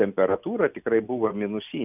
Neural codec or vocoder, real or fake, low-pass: none; real; 3.6 kHz